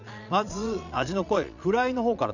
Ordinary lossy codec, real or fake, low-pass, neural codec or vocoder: none; fake; 7.2 kHz; vocoder, 22.05 kHz, 80 mel bands, Vocos